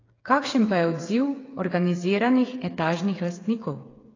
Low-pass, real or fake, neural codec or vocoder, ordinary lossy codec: 7.2 kHz; fake; codec, 16 kHz, 8 kbps, FreqCodec, smaller model; AAC, 32 kbps